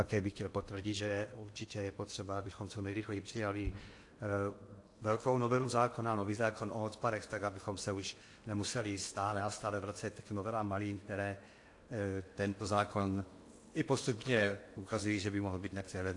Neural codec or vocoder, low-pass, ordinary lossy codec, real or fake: codec, 16 kHz in and 24 kHz out, 0.8 kbps, FocalCodec, streaming, 65536 codes; 10.8 kHz; AAC, 48 kbps; fake